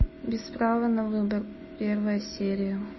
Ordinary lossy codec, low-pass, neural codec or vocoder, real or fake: MP3, 24 kbps; 7.2 kHz; none; real